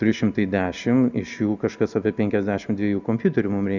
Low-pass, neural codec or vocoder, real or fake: 7.2 kHz; none; real